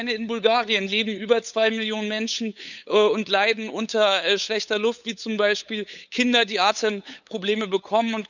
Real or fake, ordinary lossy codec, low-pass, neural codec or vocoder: fake; none; 7.2 kHz; codec, 16 kHz, 8 kbps, FunCodec, trained on LibriTTS, 25 frames a second